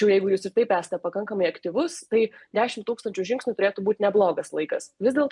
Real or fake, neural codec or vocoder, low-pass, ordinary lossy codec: real; none; 10.8 kHz; MP3, 64 kbps